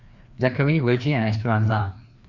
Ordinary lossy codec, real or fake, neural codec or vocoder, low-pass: none; fake; codec, 16 kHz, 2 kbps, FreqCodec, larger model; 7.2 kHz